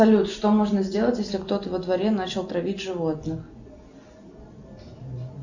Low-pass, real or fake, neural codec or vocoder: 7.2 kHz; real; none